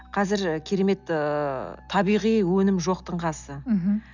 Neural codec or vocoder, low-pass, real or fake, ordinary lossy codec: none; 7.2 kHz; real; none